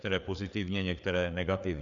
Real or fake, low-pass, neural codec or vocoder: fake; 7.2 kHz; codec, 16 kHz, 4 kbps, FreqCodec, larger model